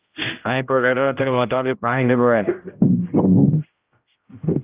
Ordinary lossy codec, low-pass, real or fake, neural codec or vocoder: Opus, 32 kbps; 3.6 kHz; fake; codec, 16 kHz, 0.5 kbps, X-Codec, HuBERT features, trained on general audio